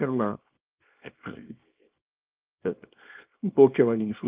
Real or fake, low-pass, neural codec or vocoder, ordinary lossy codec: fake; 3.6 kHz; codec, 24 kHz, 0.9 kbps, WavTokenizer, small release; Opus, 32 kbps